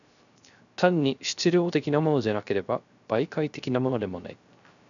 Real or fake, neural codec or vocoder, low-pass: fake; codec, 16 kHz, 0.3 kbps, FocalCodec; 7.2 kHz